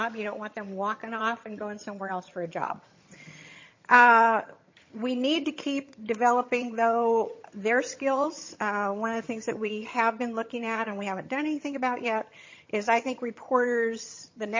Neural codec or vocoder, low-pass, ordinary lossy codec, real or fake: vocoder, 22.05 kHz, 80 mel bands, HiFi-GAN; 7.2 kHz; MP3, 32 kbps; fake